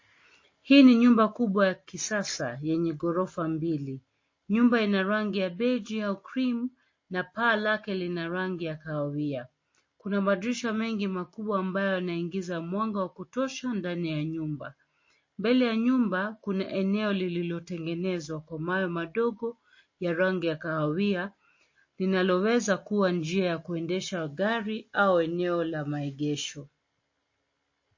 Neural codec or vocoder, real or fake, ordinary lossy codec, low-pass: none; real; MP3, 32 kbps; 7.2 kHz